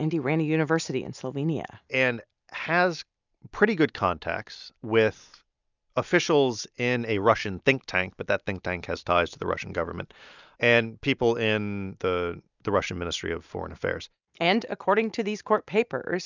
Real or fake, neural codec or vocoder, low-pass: real; none; 7.2 kHz